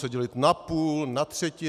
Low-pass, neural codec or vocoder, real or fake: 14.4 kHz; none; real